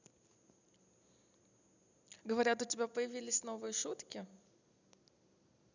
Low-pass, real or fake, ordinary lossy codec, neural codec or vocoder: 7.2 kHz; real; none; none